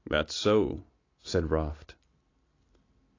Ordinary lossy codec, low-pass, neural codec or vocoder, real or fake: AAC, 32 kbps; 7.2 kHz; none; real